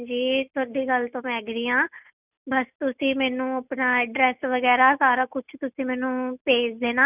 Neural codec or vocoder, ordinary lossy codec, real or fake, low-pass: none; none; real; 3.6 kHz